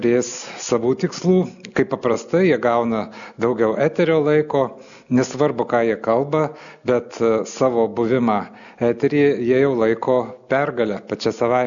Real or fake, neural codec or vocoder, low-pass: real; none; 7.2 kHz